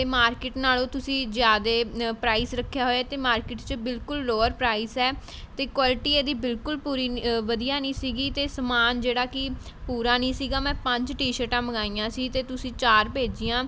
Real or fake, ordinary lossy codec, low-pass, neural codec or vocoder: real; none; none; none